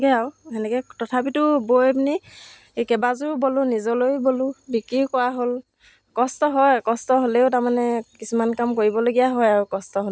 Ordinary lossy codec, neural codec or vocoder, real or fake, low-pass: none; none; real; none